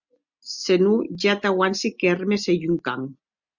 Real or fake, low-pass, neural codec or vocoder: real; 7.2 kHz; none